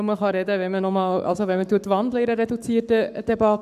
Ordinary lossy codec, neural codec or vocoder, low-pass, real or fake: none; codec, 44.1 kHz, 7.8 kbps, Pupu-Codec; 14.4 kHz; fake